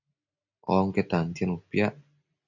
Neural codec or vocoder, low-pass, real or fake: none; 7.2 kHz; real